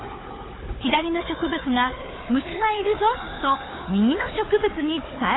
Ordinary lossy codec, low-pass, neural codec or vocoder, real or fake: AAC, 16 kbps; 7.2 kHz; codec, 16 kHz, 4 kbps, FunCodec, trained on Chinese and English, 50 frames a second; fake